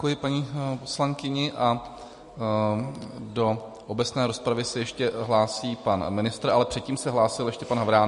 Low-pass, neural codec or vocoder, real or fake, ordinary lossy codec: 10.8 kHz; none; real; MP3, 48 kbps